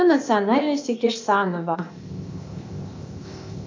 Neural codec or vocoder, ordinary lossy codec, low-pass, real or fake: codec, 16 kHz, 0.8 kbps, ZipCodec; MP3, 64 kbps; 7.2 kHz; fake